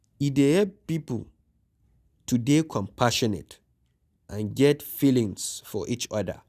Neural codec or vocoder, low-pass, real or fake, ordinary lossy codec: none; 14.4 kHz; real; none